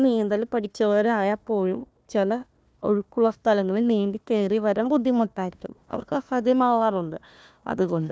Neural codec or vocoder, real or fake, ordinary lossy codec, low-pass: codec, 16 kHz, 1 kbps, FunCodec, trained on Chinese and English, 50 frames a second; fake; none; none